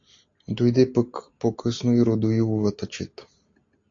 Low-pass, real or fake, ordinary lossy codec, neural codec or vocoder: 7.2 kHz; real; AAC, 48 kbps; none